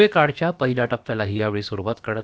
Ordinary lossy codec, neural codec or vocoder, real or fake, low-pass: none; codec, 16 kHz, about 1 kbps, DyCAST, with the encoder's durations; fake; none